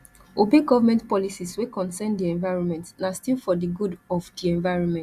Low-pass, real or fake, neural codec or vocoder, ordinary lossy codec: 14.4 kHz; real; none; Opus, 64 kbps